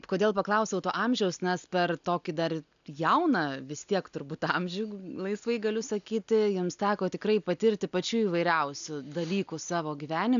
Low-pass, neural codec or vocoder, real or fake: 7.2 kHz; none; real